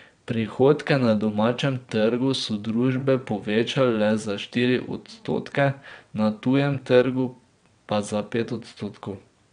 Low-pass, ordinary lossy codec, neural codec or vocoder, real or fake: 9.9 kHz; MP3, 96 kbps; vocoder, 22.05 kHz, 80 mel bands, WaveNeXt; fake